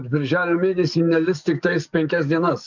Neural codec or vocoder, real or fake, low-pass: codec, 44.1 kHz, 7.8 kbps, DAC; fake; 7.2 kHz